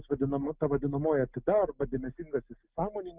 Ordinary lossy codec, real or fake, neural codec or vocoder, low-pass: Opus, 32 kbps; real; none; 3.6 kHz